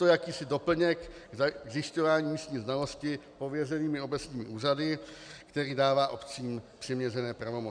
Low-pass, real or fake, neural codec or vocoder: 9.9 kHz; real; none